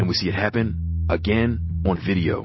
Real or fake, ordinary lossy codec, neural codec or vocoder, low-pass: real; MP3, 24 kbps; none; 7.2 kHz